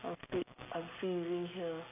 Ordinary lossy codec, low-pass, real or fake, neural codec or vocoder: AAC, 32 kbps; 3.6 kHz; real; none